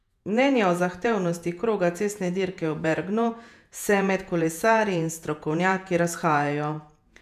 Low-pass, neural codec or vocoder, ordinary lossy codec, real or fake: 14.4 kHz; vocoder, 48 kHz, 128 mel bands, Vocos; none; fake